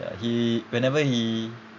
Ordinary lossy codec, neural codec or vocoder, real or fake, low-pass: MP3, 64 kbps; none; real; 7.2 kHz